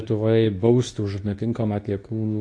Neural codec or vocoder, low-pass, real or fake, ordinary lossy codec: codec, 24 kHz, 0.9 kbps, WavTokenizer, medium speech release version 2; 9.9 kHz; fake; AAC, 48 kbps